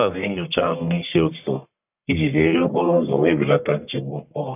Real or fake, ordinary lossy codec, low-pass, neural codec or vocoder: fake; none; 3.6 kHz; codec, 44.1 kHz, 1.7 kbps, Pupu-Codec